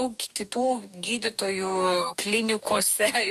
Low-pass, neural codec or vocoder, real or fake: 14.4 kHz; codec, 44.1 kHz, 2.6 kbps, DAC; fake